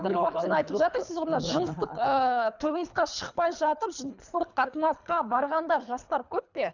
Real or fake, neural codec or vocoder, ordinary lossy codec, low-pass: fake; codec, 24 kHz, 3 kbps, HILCodec; none; 7.2 kHz